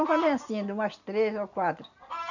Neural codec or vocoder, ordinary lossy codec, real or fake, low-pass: none; none; real; 7.2 kHz